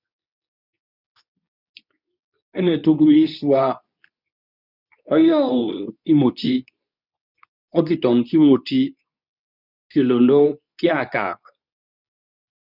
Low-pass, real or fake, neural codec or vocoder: 5.4 kHz; fake; codec, 24 kHz, 0.9 kbps, WavTokenizer, medium speech release version 2